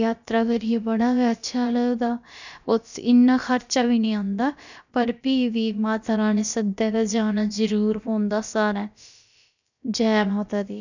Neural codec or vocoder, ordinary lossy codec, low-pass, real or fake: codec, 16 kHz, about 1 kbps, DyCAST, with the encoder's durations; none; 7.2 kHz; fake